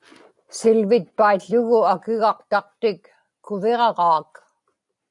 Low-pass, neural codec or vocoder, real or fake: 10.8 kHz; none; real